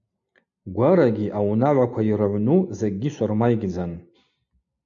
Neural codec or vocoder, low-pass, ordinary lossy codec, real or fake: none; 7.2 kHz; AAC, 64 kbps; real